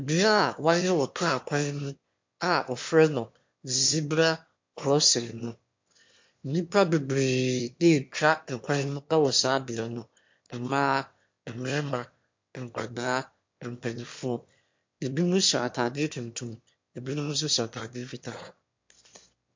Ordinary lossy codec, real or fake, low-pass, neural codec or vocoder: MP3, 48 kbps; fake; 7.2 kHz; autoencoder, 22.05 kHz, a latent of 192 numbers a frame, VITS, trained on one speaker